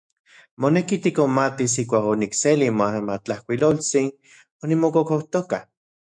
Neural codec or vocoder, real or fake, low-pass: autoencoder, 48 kHz, 128 numbers a frame, DAC-VAE, trained on Japanese speech; fake; 9.9 kHz